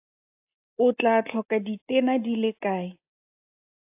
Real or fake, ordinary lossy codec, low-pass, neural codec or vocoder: real; AAC, 32 kbps; 3.6 kHz; none